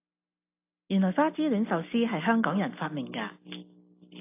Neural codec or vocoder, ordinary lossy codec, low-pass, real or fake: none; AAC, 24 kbps; 3.6 kHz; real